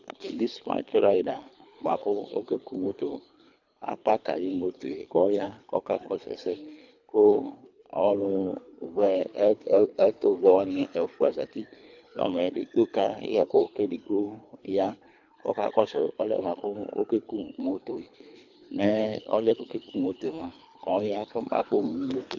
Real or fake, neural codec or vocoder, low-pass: fake; codec, 24 kHz, 3 kbps, HILCodec; 7.2 kHz